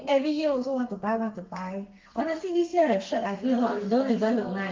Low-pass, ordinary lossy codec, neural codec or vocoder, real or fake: 7.2 kHz; Opus, 32 kbps; codec, 32 kHz, 1.9 kbps, SNAC; fake